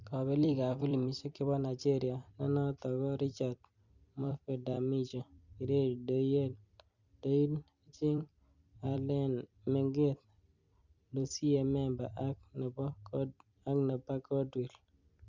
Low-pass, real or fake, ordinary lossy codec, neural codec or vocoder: 7.2 kHz; fake; Opus, 64 kbps; vocoder, 44.1 kHz, 128 mel bands every 256 samples, BigVGAN v2